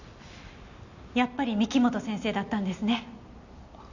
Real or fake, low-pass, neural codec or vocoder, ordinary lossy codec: real; 7.2 kHz; none; none